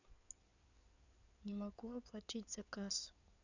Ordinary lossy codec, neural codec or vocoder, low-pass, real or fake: none; codec, 16 kHz in and 24 kHz out, 2.2 kbps, FireRedTTS-2 codec; 7.2 kHz; fake